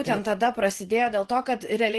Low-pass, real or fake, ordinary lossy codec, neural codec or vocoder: 10.8 kHz; fake; Opus, 16 kbps; vocoder, 24 kHz, 100 mel bands, Vocos